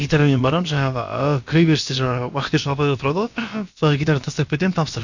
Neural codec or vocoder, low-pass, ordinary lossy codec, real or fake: codec, 16 kHz, about 1 kbps, DyCAST, with the encoder's durations; 7.2 kHz; none; fake